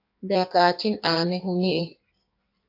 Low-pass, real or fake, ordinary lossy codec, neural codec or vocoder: 5.4 kHz; fake; AAC, 32 kbps; codec, 16 kHz in and 24 kHz out, 1.1 kbps, FireRedTTS-2 codec